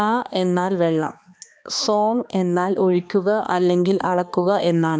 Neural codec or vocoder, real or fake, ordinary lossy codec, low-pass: codec, 16 kHz, 2 kbps, X-Codec, HuBERT features, trained on balanced general audio; fake; none; none